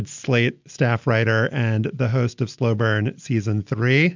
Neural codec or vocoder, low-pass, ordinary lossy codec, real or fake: none; 7.2 kHz; MP3, 64 kbps; real